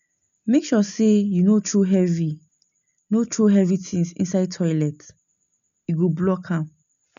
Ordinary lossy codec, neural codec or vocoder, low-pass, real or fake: none; none; 7.2 kHz; real